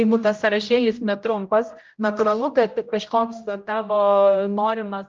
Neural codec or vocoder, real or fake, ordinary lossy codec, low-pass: codec, 16 kHz, 0.5 kbps, X-Codec, HuBERT features, trained on general audio; fake; Opus, 24 kbps; 7.2 kHz